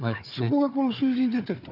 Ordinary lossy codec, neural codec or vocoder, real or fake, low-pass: none; codec, 16 kHz, 4 kbps, FunCodec, trained on Chinese and English, 50 frames a second; fake; 5.4 kHz